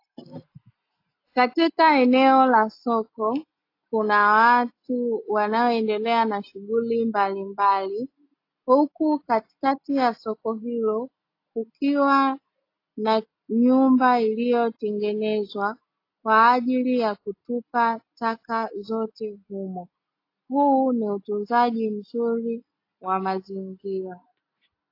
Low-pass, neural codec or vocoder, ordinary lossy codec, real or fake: 5.4 kHz; none; AAC, 32 kbps; real